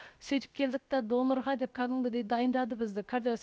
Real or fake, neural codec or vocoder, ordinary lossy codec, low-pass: fake; codec, 16 kHz, 0.7 kbps, FocalCodec; none; none